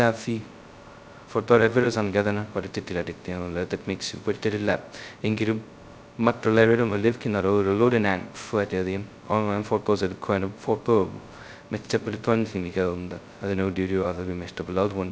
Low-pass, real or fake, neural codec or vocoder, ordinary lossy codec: none; fake; codec, 16 kHz, 0.2 kbps, FocalCodec; none